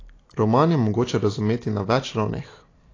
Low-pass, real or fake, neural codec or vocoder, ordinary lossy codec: 7.2 kHz; real; none; AAC, 32 kbps